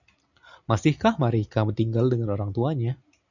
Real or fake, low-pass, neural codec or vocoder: real; 7.2 kHz; none